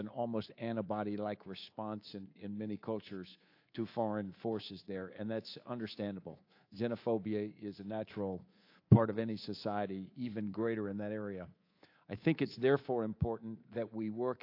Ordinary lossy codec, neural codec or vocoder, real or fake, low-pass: AAC, 32 kbps; codec, 24 kHz, 3.1 kbps, DualCodec; fake; 5.4 kHz